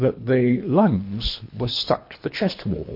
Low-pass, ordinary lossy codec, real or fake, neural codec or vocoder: 5.4 kHz; MP3, 32 kbps; fake; codec, 24 kHz, 3 kbps, HILCodec